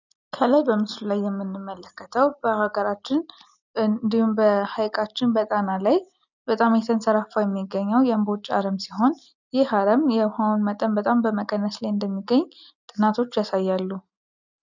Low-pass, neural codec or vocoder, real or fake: 7.2 kHz; none; real